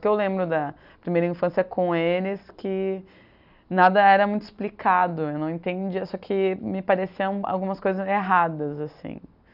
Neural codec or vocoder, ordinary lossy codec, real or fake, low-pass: none; none; real; 5.4 kHz